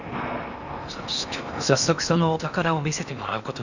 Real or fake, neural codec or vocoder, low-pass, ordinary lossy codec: fake; codec, 16 kHz in and 24 kHz out, 0.8 kbps, FocalCodec, streaming, 65536 codes; 7.2 kHz; none